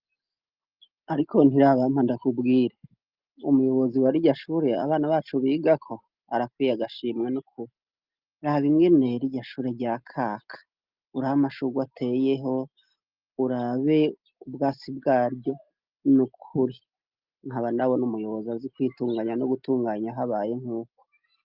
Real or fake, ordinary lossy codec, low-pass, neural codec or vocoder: real; Opus, 24 kbps; 5.4 kHz; none